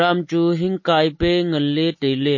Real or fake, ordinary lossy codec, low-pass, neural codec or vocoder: real; MP3, 32 kbps; 7.2 kHz; none